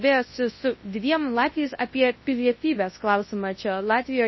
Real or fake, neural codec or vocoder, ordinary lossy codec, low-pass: fake; codec, 24 kHz, 0.9 kbps, WavTokenizer, large speech release; MP3, 24 kbps; 7.2 kHz